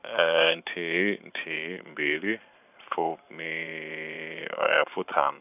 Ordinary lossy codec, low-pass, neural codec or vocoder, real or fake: none; 3.6 kHz; vocoder, 44.1 kHz, 128 mel bands every 512 samples, BigVGAN v2; fake